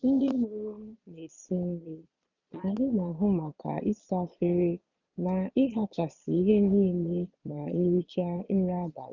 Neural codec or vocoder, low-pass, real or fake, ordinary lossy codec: vocoder, 22.05 kHz, 80 mel bands, Vocos; 7.2 kHz; fake; none